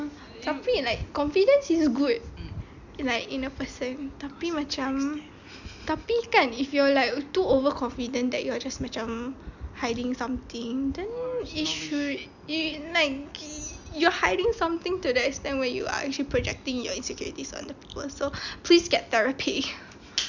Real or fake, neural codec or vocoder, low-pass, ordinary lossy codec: real; none; 7.2 kHz; none